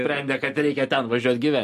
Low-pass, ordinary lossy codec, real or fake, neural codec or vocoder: 14.4 kHz; MP3, 64 kbps; real; none